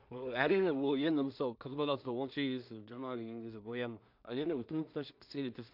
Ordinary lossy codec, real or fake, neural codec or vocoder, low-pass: AAC, 48 kbps; fake; codec, 16 kHz in and 24 kHz out, 0.4 kbps, LongCat-Audio-Codec, two codebook decoder; 5.4 kHz